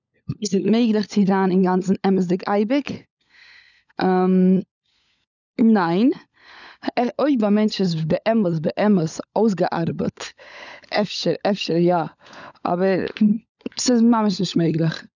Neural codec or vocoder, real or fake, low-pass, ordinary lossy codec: codec, 16 kHz, 16 kbps, FunCodec, trained on LibriTTS, 50 frames a second; fake; 7.2 kHz; none